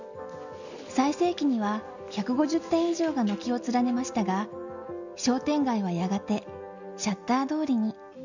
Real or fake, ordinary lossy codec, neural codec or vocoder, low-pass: real; none; none; 7.2 kHz